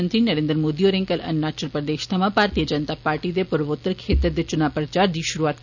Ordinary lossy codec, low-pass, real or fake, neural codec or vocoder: none; 7.2 kHz; real; none